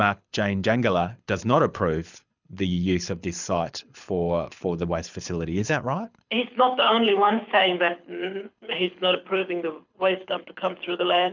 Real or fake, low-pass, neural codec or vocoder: fake; 7.2 kHz; codec, 24 kHz, 6 kbps, HILCodec